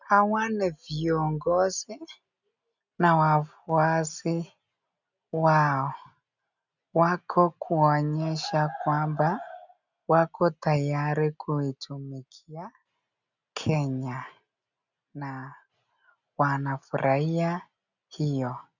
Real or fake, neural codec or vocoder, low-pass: real; none; 7.2 kHz